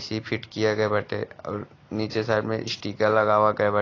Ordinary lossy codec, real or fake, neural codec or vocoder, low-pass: AAC, 32 kbps; real; none; 7.2 kHz